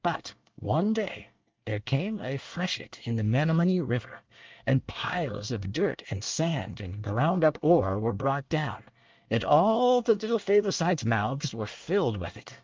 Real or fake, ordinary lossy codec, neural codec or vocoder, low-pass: fake; Opus, 24 kbps; codec, 24 kHz, 1 kbps, SNAC; 7.2 kHz